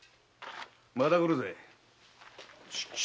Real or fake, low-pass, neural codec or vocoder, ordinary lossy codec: real; none; none; none